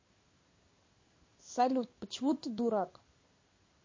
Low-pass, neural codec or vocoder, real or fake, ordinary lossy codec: 7.2 kHz; codec, 16 kHz in and 24 kHz out, 1 kbps, XY-Tokenizer; fake; MP3, 32 kbps